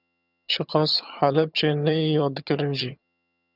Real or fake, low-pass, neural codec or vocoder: fake; 5.4 kHz; vocoder, 22.05 kHz, 80 mel bands, HiFi-GAN